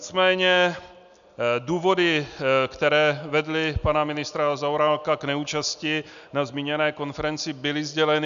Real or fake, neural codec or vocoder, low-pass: real; none; 7.2 kHz